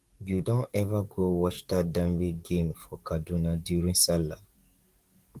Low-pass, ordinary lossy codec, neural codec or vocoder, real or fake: 14.4 kHz; Opus, 24 kbps; codec, 44.1 kHz, 7.8 kbps, DAC; fake